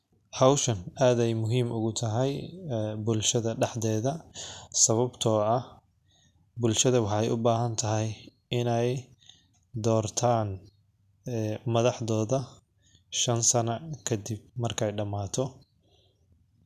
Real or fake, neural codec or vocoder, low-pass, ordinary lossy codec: real; none; 14.4 kHz; none